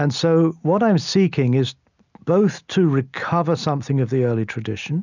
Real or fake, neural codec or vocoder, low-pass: real; none; 7.2 kHz